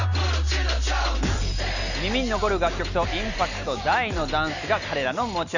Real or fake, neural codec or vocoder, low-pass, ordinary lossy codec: real; none; 7.2 kHz; none